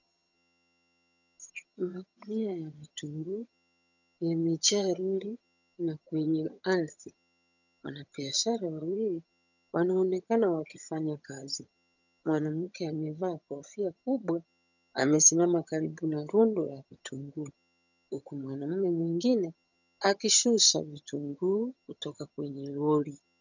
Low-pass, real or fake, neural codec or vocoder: 7.2 kHz; fake; vocoder, 22.05 kHz, 80 mel bands, HiFi-GAN